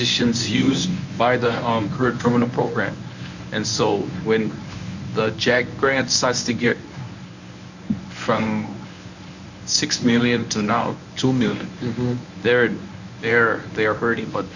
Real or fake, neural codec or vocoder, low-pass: fake; codec, 24 kHz, 0.9 kbps, WavTokenizer, medium speech release version 1; 7.2 kHz